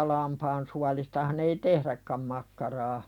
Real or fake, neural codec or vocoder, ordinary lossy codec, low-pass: real; none; none; 19.8 kHz